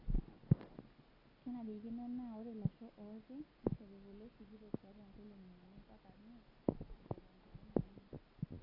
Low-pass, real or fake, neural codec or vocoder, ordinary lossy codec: 5.4 kHz; real; none; AAC, 32 kbps